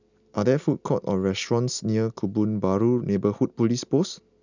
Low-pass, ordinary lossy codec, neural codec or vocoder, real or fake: 7.2 kHz; none; none; real